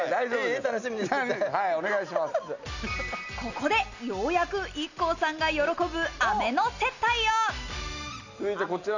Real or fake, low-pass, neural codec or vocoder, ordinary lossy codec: real; 7.2 kHz; none; none